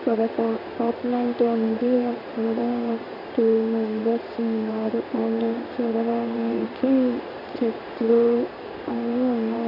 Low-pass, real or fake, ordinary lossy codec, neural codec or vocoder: 5.4 kHz; fake; AAC, 32 kbps; codec, 16 kHz in and 24 kHz out, 1 kbps, XY-Tokenizer